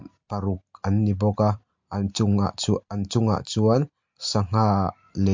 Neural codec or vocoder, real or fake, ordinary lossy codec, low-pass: none; real; MP3, 48 kbps; 7.2 kHz